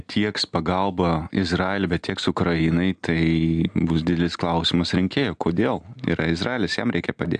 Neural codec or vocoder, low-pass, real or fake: none; 9.9 kHz; real